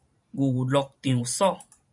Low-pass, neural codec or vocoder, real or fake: 10.8 kHz; vocoder, 44.1 kHz, 128 mel bands every 512 samples, BigVGAN v2; fake